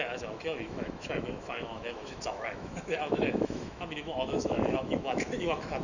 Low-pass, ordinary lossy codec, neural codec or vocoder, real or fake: 7.2 kHz; none; autoencoder, 48 kHz, 128 numbers a frame, DAC-VAE, trained on Japanese speech; fake